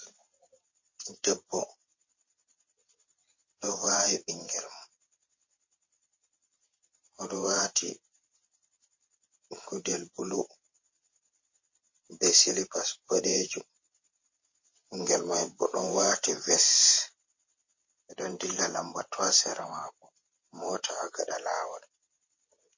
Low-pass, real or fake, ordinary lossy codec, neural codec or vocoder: 7.2 kHz; fake; MP3, 32 kbps; vocoder, 22.05 kHz, 80 mel bands, WaveNeXt